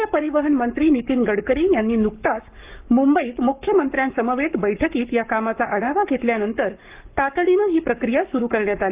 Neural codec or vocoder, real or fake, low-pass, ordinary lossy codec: codec, 44.1 kHz, 7.8 kbps, Pupu-Codec; fake; 3.6 kHz; Opus, 24 kbps